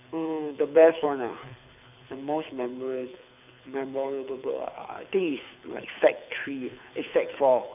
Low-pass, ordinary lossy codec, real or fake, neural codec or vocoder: 3.6 kHz; none; fake; codec, 24 kHz, 6 kbps, HILCodec